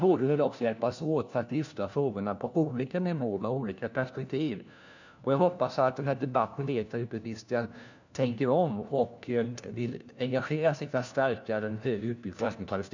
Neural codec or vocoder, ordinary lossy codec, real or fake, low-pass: codec, 16 kHz, 1 kbps, FunCodec, trained on LibriTTS, 50 frames a second; none; fake; 7.2 kHz